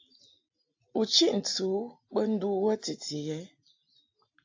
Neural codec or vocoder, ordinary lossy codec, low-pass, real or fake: none; AAC, 48 kbps; 7.2 kHz; real